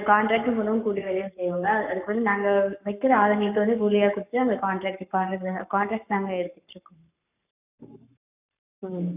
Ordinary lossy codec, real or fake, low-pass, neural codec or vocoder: none; fake; 3.6 kHz; codec, 44.1 kHz, 7.8 kbps, Pupu-Codec